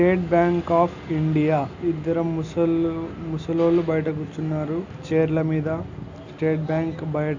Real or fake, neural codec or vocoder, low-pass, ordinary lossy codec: real; none; 7.2 kHz; AAC, 48 kbps